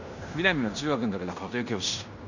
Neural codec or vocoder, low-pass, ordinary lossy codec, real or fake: codec, 16 kHz in and 24 kHz out, 0.9 kbps, LongCat-Audio-Codec, fine tuned four codebook decoder; 7.2 kHz; none; fake